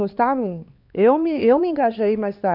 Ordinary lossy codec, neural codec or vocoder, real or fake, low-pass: none; codec, 16 kHz, 2 kbps, X-Codec, HuBERT features, trained on LibriSpeech; fake; 5.4 kHz